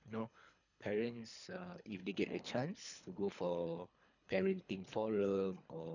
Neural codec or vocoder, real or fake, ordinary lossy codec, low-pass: codec, 24 kHz, 3 kbps, HILCodec; fake; none; 7.2 kHz